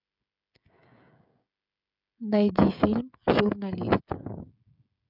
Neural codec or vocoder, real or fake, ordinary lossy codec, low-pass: codec, 16 kHz, 16 kbps, FreqCodec, smaller model; fake; none; 5.4 kHz